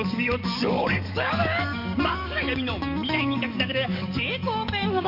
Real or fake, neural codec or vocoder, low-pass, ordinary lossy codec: fake; codec, 44.1 kHz, 7.8 kbps, DAC; 5.4 kHz; none